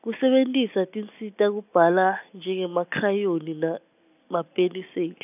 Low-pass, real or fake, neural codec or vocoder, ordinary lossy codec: 3.6 kHz; real; none; none